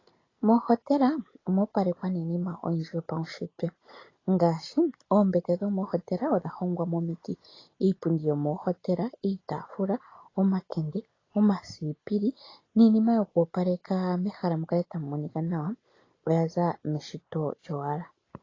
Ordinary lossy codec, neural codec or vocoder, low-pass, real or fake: AAC, 32 kbps; none; 7.2 kHz; real